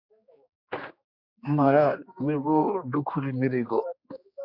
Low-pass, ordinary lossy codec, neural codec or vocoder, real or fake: 5.4 kHz; Opus, 64 kbps; codec, 16 kHz, 2 kbps, X-Codec, HuBERT features, trained on general audio; fake